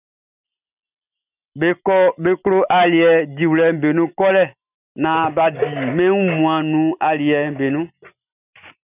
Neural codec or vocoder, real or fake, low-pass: none; real; 3.6 kHz